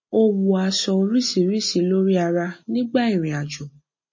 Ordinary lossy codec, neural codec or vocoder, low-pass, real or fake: MP3, 32 kbps; none; 7.2 kHz; real